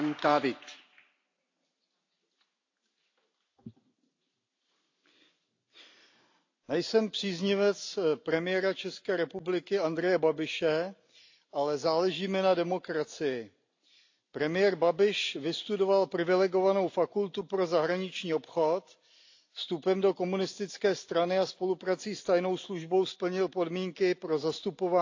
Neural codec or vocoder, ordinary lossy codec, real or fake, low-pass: none; AAC, 48 kbps; real; 7.2 kHz